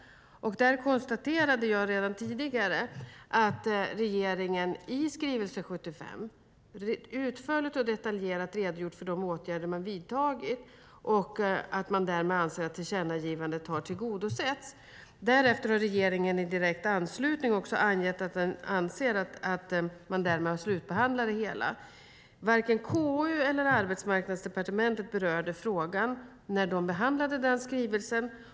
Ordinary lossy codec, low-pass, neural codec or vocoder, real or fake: none; none; none; real